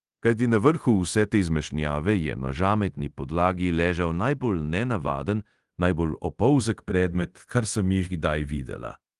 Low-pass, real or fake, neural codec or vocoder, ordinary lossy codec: 10.8 kHz; fake; codec, 24 kHz, 0.5 kbps, DualCodec; Opus, 24 kbps